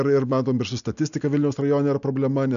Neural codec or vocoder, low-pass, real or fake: none; 7.2 kHz; real